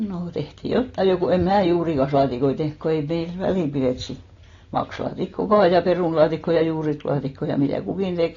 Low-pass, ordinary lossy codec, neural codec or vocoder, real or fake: 7.2 kHz; AAC, 32 kbps; none; real